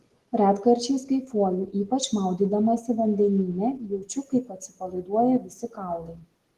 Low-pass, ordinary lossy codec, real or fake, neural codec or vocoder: 14.4 kHz; Opus, 16 kbps; real; none